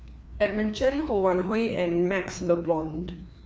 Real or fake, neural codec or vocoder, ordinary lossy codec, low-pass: fake; codec, 16 kHz, 2 kbps, FreqCodec, larger model; none; none